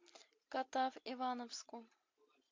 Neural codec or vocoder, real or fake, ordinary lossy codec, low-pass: none; real; MP3, 48 kbps; 7.2 kHz